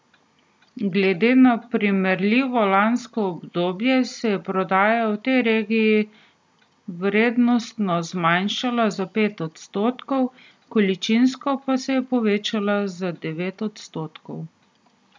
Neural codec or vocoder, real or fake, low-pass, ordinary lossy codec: none; real; none; none